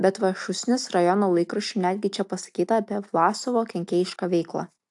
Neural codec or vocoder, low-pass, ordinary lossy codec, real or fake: none; 10.8 kHz; AAC, 64 kbps; real